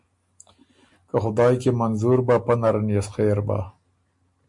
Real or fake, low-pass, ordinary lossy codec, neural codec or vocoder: real; 10.8 kHz; MP3, 64 kbps; none